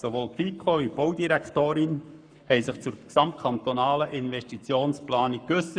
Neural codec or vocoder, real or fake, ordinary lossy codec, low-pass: codec, 44.1 kHz, 7.8 kbps, Pupu-Codec; fake; none; 9.9 kHz